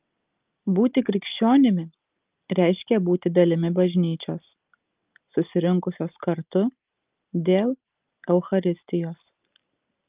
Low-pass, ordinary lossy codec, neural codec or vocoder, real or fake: 3.6 kHz; Opus, 24 kbps; none; real